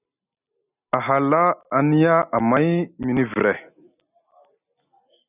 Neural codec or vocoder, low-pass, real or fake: none; 3.6 kHz; real